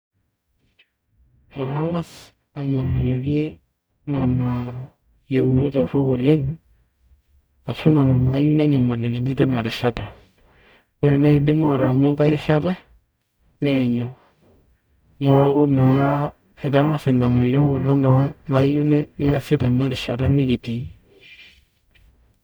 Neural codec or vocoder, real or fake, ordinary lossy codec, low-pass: codec, 44.1 kHz, 0.9 kbps, DAC; fake; none; none